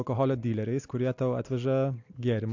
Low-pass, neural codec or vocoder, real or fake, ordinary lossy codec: 7.2 kHz; none; real; AAC, 48 kbps